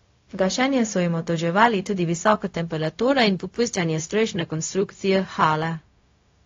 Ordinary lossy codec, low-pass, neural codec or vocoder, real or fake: AAC, 32 kbps; 7.2 kHz; codec, 16 kHz, 0.4 kbps, LongCat-Audio-Codec; fake